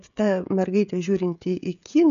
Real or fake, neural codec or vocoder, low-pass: fake; codec, 16 kHz, 16 kbps, FreqCodec, smaller model; 7.2 kHz